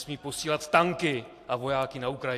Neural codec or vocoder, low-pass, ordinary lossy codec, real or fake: vocoder, 44.1 kHz, 128 mel bands every 512 samples, BigVGAN v2; 14.4 kHz; AAC, 64 kbps; fake